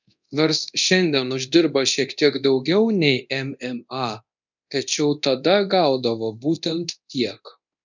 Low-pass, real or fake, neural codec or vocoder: 7.2 kHz; fake; codec, 24 kHz, 0.9 kbps, DualCodec